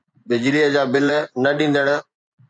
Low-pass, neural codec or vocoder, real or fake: 9.9 kHz; vocoder, 24 kHz, 100 mel bands, Vocos; fake